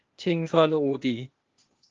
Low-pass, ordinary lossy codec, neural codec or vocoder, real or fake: 7.2 kHz; Opus, 32 kbps; codec, 16 kHz, 0.8 kbps, ZipCodec; fake